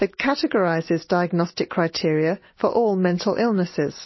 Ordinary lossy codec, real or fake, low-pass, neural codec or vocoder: MP3, 24 kbps; real; 7.2 kHz; none